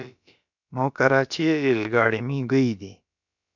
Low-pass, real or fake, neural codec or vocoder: 7.2 kHz; fake; codec, 16 kHz, about 1 kbps, DyCAST, with the encoder's durations